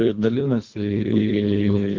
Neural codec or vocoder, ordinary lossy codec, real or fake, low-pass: codec, 24 kHz, 1.5 kbps, HILCodec; Opus, 32 kbps; fake; 7.2 kHz